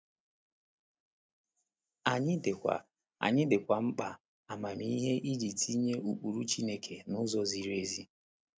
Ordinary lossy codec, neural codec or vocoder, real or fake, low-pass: none; none; real; none